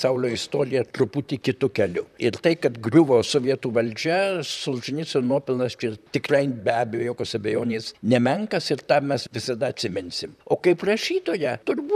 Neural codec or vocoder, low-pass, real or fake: vocoder, 44.1 kHz, 128 mel bands, Pupu-Vocoder; 14.4 kHz; fake